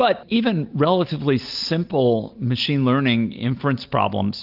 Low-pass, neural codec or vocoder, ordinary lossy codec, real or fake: 5.4 kHz; none; Opus, 24 kbps; real